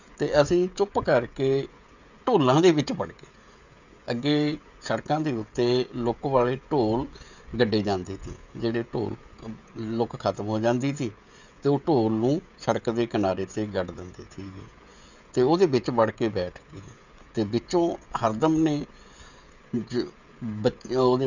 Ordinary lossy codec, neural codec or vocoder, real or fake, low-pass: none; codec, 16 kHz, 16 kbps, FreqCodec, smaller model; fake; 7.2 kHz